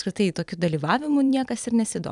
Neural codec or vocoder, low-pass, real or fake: vocoder, 44.1 kHz, 128 mel bands every 256 samples, BigVGAN v2; 10.8 kHz; fake